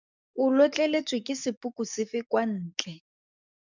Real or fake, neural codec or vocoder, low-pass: fake; codec, 44.1 kHz, 7.8 kbps, DAC; 7.2 kHz